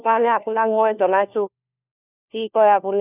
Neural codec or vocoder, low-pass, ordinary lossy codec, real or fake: codec, 16 kHz, 1 kbps, FunCodec, trained on LibriTTS, 50 frames a second; 3.6 kHz; none; fake